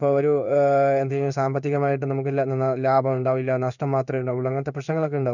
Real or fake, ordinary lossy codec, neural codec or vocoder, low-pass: fake; none; codec, 16 kHz in and 24 kHz out, 1 kbps, XY-Tokenizer; 7.2 kHz